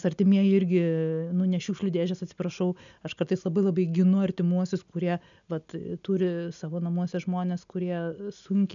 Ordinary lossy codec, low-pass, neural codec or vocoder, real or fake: MP3, 96 kbps; 7.2 kHz; none; real